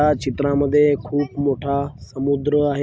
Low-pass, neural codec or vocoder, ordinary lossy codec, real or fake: none; none; none; real